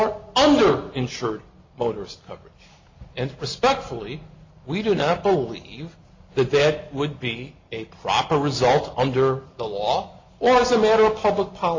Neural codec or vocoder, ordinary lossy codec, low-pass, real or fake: none; MP3, 64 kbps; 7.2 kHz; real